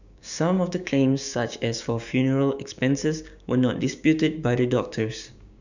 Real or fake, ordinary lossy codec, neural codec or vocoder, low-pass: fake; none; codec, 16 kHz, 6 kbps, DAC; 7.2 kHz